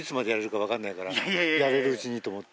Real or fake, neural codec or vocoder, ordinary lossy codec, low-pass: real; none; none; none